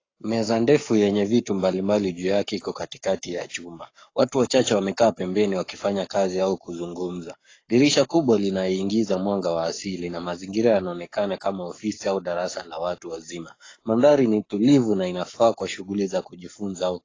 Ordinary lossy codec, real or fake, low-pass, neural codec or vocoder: AAC, 32 kbps; fake; 7.2 kHz; codec, 44.1 kHz, 7.8 kbps, Pupu-Codec